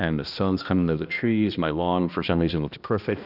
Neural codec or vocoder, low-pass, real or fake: codec, 16 kHz, 1 kbps, X-Codec, HuBERT features, trained on balanced general audio; 5.4 kHz; fake